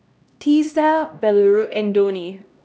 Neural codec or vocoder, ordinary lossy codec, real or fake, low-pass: codec, 16 kHz, 1 kbps, X-Codec, HuBERT features, trained on LibriSpeech; none; fake; none